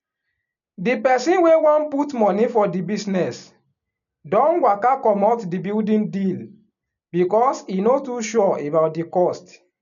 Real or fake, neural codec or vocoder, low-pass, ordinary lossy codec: real; none; 7.2 kHz; none